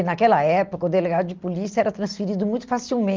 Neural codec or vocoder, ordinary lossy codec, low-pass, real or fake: none; Opus, 24 kbps; 7.2 kHz; real